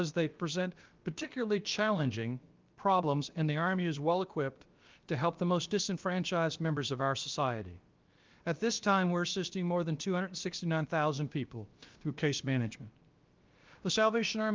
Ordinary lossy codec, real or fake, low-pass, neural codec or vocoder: Opus, 32 kbps; fake; 7.2 kHz; codec, 16 kHz, about 1 kbps, DyCAST, with the encoder's durations